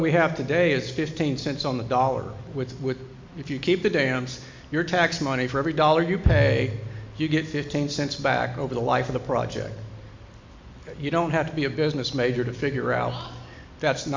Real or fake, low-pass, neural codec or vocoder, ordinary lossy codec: real; 7.2 kHz; none; MP3, 64 kbps